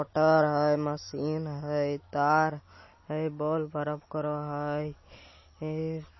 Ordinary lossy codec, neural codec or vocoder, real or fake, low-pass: MP3, 24 kbps; none; real; 7.2 kHz